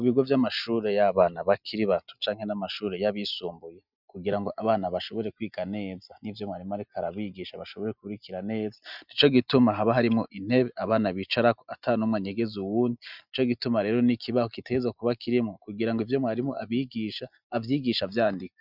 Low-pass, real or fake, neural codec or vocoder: 5.4 kHz; real; none